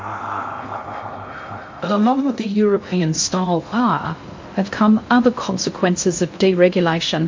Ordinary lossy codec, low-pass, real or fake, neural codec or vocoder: MP3, 48 kbps; 7.2 kHz; fake; codec, 16 kHz in and 24 kHz out, 0.6 kbps, FocalCodec, streaming, 2048 codes